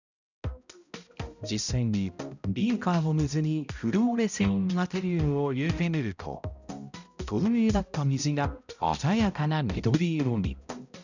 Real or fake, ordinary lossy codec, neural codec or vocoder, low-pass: fake; none; codec, 16 kHz, 0.5 kbps, X-Codec, HuBERT features, trained on balanced general audio; 7.2 kHz